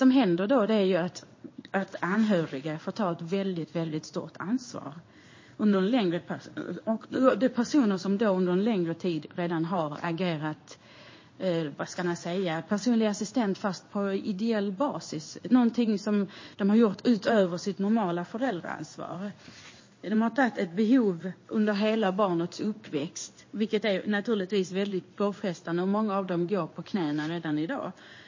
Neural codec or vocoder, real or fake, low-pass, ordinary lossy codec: codec, 16 kHz in and 24 kHz out, 1 kbps, XY-Tokenizer; fake; 7.2 kHz; MP3, 32 kbps